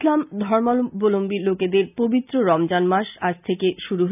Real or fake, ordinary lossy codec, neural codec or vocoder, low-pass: real; none; none; 3.6 kHz